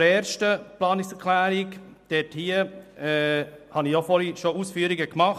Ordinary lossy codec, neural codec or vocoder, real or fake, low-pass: MP3, 96 kbps; none; real; 14.4 kHz